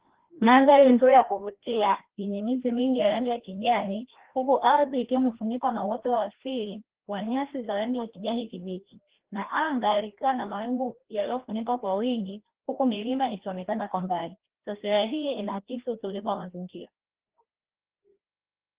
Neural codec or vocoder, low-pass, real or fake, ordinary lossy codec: codec, 16 kHz, 1 kbps, FreqCodec, larger model; 3.6 kHz; fake; Opus, 16 kbps